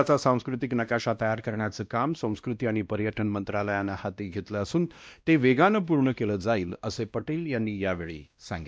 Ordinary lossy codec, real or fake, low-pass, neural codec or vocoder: none; fake; none; codec, 16 kHz, 1 kbps, X-Codec, WavLM features, trained on Multilingual LibriSpeech